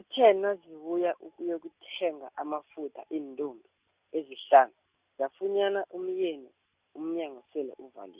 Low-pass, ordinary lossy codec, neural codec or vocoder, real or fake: 3.6 kHz; Opus, 64 kbps; none; real